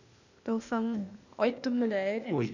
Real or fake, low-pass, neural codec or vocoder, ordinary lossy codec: fake; 7.2 kHz; codec, 16 kHz, 0.8 kbps, ZipCodec; none